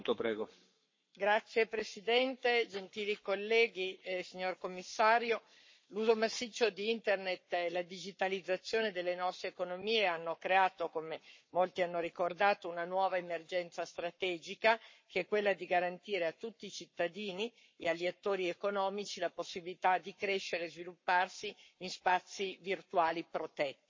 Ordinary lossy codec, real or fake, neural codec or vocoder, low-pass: MP3, 32 kbps; fake; codec, 44.1 kHz, 7.8 kbps, Pupu-Codec; 7.2 kHz